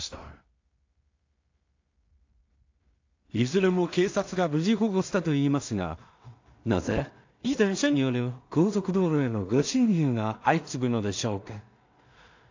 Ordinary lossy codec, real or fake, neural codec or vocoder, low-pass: AAC, 48 kbps; fake; codec, 16 kHz in and 24 kHz out, 0.4 kbps, LongCat-Audio-Codec, two codebook decoder; 7.2 kHz